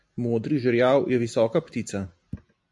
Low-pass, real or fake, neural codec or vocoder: 10.8 kHz; real; none